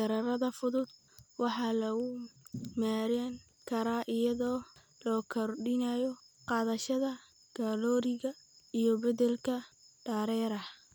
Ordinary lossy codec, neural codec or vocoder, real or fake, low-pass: none; none; real; none